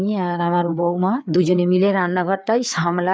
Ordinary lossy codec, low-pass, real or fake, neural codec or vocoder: none; none; fake; codec, 16 kHz, 4 kbps, FreqCodec, larger model